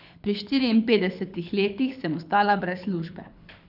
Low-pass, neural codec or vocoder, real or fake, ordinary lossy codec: 5.4 kHz; codec, 24 kHz, 6 kbps, HILCodec; fake; none